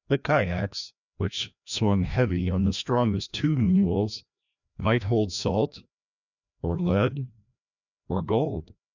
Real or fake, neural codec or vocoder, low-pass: fake; codec, 16 kHz, 1 kbps, FreqCodec, larger model; 7.2 kHz